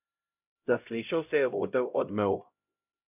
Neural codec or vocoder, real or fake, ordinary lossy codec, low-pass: codec, 16 kHz, 0.5 kbps, X-Codec, HuBERT features, trained on LibriSpeech; fake; none; 3.6 kHz